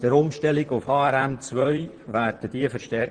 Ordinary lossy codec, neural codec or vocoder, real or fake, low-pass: Opus, 16 kbps; vocoder, 44.1 kHz, 128 mel bands, Pupu-Vocoder; fake; 9.9 kHz